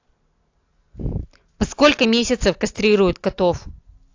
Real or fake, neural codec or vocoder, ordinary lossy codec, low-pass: real; none; AAC, 48 kbps; 7.2 kHz